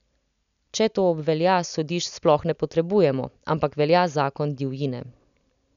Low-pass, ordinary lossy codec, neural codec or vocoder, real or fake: 7.2 kHz; none; none; real